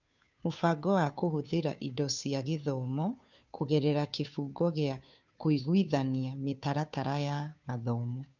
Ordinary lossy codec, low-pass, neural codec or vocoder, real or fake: none; 7.2 kHz; codec, 16 kHz, 2 kbps, FunCodec, trained on Chinese and English, 25 frames a second; fake